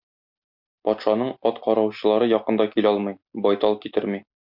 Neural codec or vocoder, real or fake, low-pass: none; real; 5.4 kHz